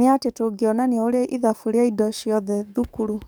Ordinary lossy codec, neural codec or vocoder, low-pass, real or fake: none; codec, 44.1 kHz, 7.8 kbps, DAC; none; fake